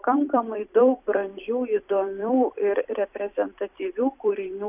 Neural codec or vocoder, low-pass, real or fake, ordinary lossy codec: vocoder, 44.1 kHz, 128 mel bands every 256 samples, BigVGAN v2; 3.6 kHz; fake; AAC, 32 kbps